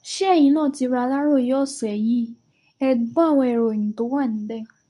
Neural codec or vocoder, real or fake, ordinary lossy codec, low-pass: codec, 24 kHz, 0.9 kbps, WavTokenizer, medium speech release version 2; fake; none; 10.8 kHz